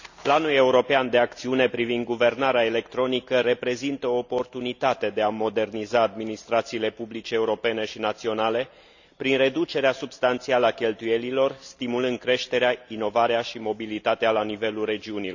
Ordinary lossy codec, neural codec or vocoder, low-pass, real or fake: none; none; 7.2 kHz; real